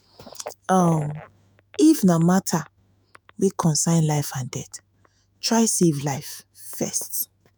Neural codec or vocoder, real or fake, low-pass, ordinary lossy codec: autoencoder, 48 kHz, 128 numbers a frame, DAC-VAE, trained on Japanese speech; fake; none; none